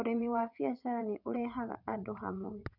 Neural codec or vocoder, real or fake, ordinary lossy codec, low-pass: vocoder, 22.05 kHz, 80 mel bands, WaveNeXt; fake; none; 5.4 kHz